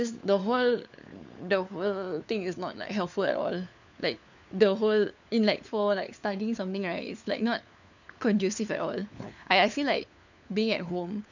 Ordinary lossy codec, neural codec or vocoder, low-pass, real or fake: none; codec, 16 kHz, 4 kbps, FunCodec, trained on LibriTTS, 50 frames a second; 7.2 kHz; fake